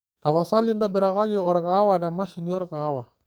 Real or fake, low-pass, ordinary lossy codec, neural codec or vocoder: fake; none; none; codec, 44.1 kHz, 2.6 kbps, SNAC